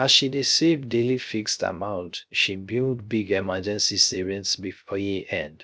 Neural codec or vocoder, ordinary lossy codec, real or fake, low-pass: codec, 16 kHz, 0.3 kbps, FocalCodec; none; fake; none